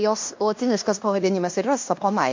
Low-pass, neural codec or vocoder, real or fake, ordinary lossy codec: 7.2 kHz; codec, 16 kHz in and 24 kHz out, 0.9 kbps, LongCat-Audio-Codec, fine tuned four codebook decoder; fake; MP3, 48 kbps